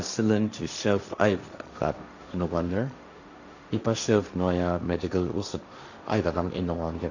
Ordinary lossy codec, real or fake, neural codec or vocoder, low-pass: none; fake; codec, 16 kHz, 1.1 kbps, Voila-Tokenizer; 7.2 kHz